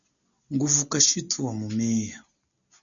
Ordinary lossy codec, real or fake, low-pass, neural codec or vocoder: MP3, 96 kbps; real; 7.2 kHz; none